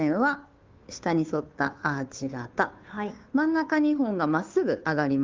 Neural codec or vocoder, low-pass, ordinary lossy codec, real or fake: codec, 16 kHz, 2 kbps, FunCodec, trained on Chinese and English, 25 frames a second; 7.2 kHz; Opus, 32 kbps; fake